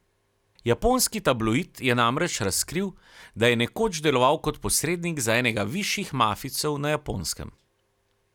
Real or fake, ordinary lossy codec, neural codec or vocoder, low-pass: real; none; none; 19.8 kHz